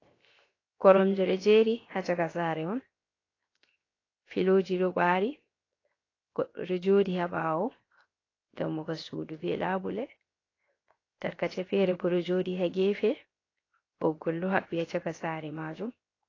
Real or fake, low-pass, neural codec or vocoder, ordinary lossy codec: fake; 7.2 kHz; codec, 16 kHz, 0.7 kbps, FocalCodec; AAC, 32 kbps